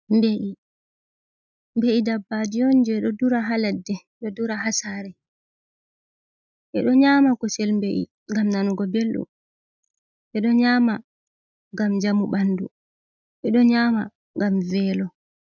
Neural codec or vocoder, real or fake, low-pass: none; real; 7.2 kHz